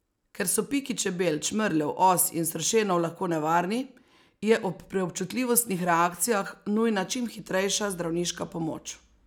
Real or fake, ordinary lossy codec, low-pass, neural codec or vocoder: real; none; none; none